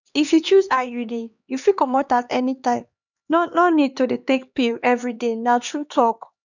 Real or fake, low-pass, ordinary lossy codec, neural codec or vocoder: fake; 7.2 kHz; none; codec, 16 kHz, 2 kbps, X-Codec, HuBERT features, trained on LibriSpeech